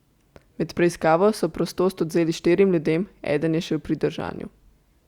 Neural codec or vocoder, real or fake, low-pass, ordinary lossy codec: none; real; 19.8 kHz; Opus, 64 kbps